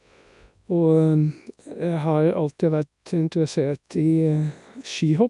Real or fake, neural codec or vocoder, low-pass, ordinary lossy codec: fake; codec, 24 kHz, 0.9 kbps, WavTokenizer, large speech release; 10.8 kHz; MP3, 96 kbps